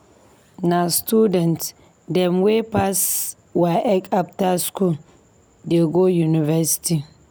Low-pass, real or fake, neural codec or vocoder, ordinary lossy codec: none; real; none; none